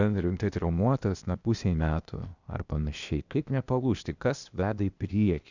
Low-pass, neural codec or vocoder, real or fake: 7.2 kHz; codec, 16 kHz, 0.8 kbps, ZipCodec; fake